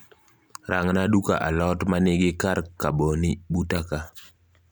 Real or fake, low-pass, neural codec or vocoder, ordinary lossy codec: real; none; none; none